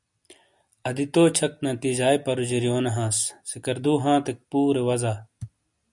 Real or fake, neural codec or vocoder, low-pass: real; none; 10.8 kHz